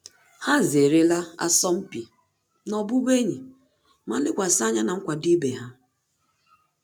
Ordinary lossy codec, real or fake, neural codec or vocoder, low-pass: none; real; none; none